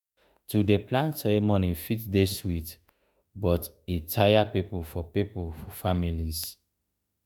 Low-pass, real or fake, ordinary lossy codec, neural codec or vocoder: none; fake; none; autoencoder, 48 kHz, 32 numbers a frame, DAC-VAE, trained on Japanese speech